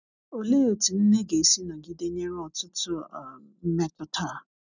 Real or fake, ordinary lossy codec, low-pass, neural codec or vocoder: real; none; 7.2 kHz; none